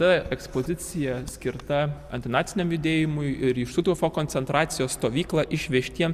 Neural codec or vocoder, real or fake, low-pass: none; real; 14.4 kHz